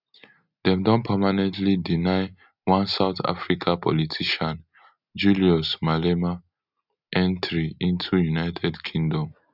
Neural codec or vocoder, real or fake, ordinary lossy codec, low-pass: none; real; none; 5.4 kHz